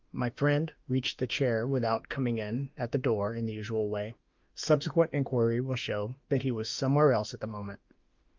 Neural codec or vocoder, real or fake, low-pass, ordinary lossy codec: autoencoder, 48 kHz, 32 numbers a frame, DAC-VAE, trained on Japanese speech; fake; 7.2 kHz; Opus, 24 kbps